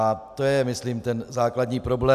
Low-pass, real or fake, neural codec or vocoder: 14.4 kHz; real; none